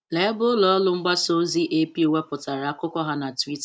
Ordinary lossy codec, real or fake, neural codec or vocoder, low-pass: none; real; none; none